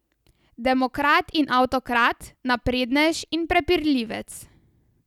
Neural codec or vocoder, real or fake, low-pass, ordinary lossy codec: none; real; 19.8 kHz; none